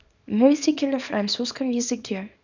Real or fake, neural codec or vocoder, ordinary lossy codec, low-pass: fake; codec, 24 kHz, 0.9 kbps, WavTokenizer, small release; none; 7.2 kHz